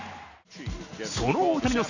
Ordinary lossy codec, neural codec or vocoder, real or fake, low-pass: none; none; real; 7.2 kHz